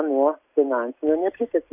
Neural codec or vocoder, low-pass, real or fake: none; 3.6 kHz; real